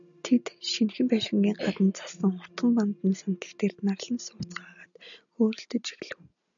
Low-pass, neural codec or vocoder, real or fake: 7.2 kHz; none; real